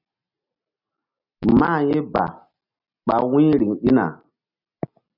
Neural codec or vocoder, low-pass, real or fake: none; 5.4 kHz; real